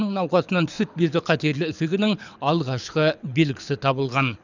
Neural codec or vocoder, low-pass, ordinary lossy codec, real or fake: codec, 24 kHz, 6 kbps, HILCodec; 7.2 kHz; none; fake